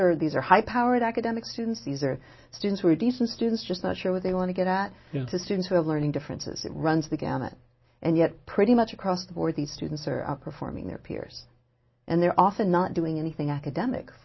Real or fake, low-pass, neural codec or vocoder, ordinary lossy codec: real; 7.2 kHz; none; MP3, 24 kbps